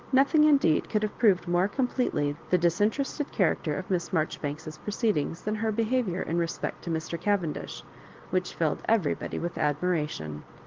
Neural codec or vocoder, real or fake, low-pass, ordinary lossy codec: none; real; 7.2 kHz; Opus, 16 kbps